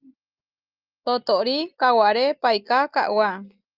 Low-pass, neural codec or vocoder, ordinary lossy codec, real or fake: 5.4 kHz; none; Opus, 24 kbps; real